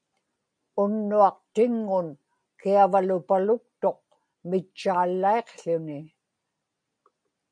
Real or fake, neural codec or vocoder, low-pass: real; none; 9.9 kHz